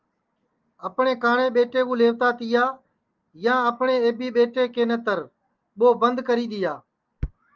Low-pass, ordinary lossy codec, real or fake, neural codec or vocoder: 7.2 kHz; Opus, 24 kbps; real; none